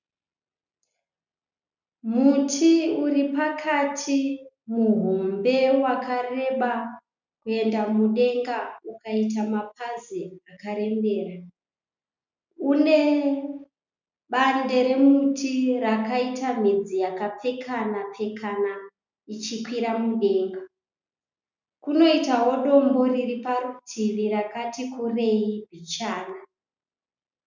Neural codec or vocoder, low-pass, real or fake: none; 7.2 kHz; real